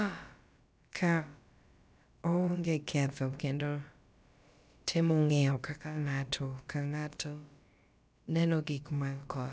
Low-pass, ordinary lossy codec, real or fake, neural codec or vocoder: none; none; fake; codec, 16 kHz, about 1 kbps, DyCAST, with the encoder's durations